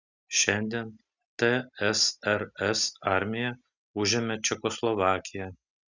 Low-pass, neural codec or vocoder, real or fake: 7.2 kHz; none; real